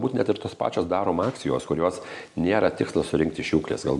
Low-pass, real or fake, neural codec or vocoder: 10.8 kHz; real; none